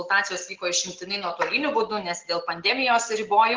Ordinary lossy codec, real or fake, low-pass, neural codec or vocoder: Opus, 16 kbps; real; 7.2 kHz; none